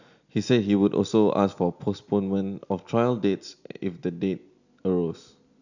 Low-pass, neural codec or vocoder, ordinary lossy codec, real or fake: 7.2 kHz; none; none; real